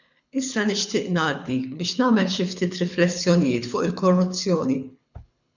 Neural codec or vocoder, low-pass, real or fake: codec, 24 kHz, 6 kbps, HILCodec; 7.2 kHz; fake